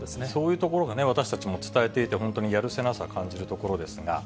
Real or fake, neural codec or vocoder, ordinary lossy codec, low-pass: real; none; none; none